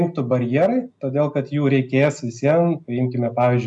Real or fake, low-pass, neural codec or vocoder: real; 10.8 kHz; none